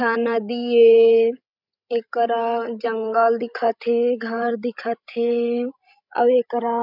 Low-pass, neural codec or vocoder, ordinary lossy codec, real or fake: 5.4 kHz; codec, 16 kHz, 16 kbps, FreqCodec, larger model; none; fake